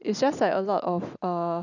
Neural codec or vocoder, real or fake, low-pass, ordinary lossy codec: none; real; 7.2 kHz; none